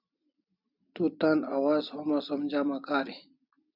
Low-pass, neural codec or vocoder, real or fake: 5.4 kHz; none; real